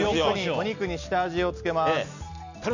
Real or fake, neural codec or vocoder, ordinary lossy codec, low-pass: real; none; none; 7.2 kHz